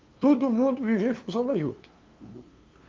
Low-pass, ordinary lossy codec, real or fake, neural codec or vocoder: 7.2 kHz; Opus, 32 kbps; fake; codec, 16 kHz, 2 kbps, FunCodec, trained on LibriTTS, 25 frames a second